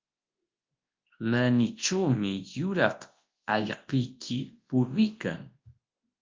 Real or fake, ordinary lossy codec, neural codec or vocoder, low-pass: fake; Opus, 32 kbps; codec, 24 kHz, 0.9 kbps, WavTokenizer, large speech release; 7.2 kHz